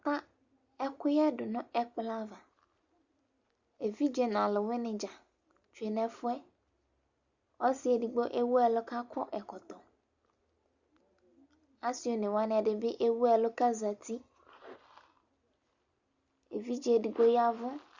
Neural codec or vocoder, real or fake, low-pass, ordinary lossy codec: none; real; 7.2 kHz; Opus, 64 kbps